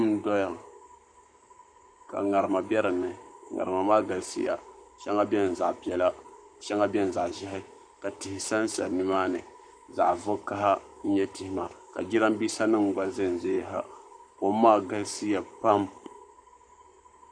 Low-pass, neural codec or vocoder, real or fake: 9.9 kHz; codec, 44.1 kHz, 7.8 kbps, Pupu-Codec; fake